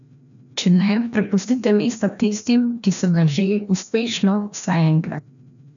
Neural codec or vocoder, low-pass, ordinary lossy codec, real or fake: codec, 16 kHz, 1 kbps, FreqCodec, larger model; 7.2 kHz; none; fake